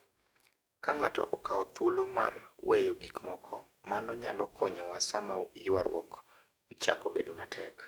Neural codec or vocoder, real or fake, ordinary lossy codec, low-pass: codec, 44.1 kHz, 2.6 kbps, DAC; fake; none; none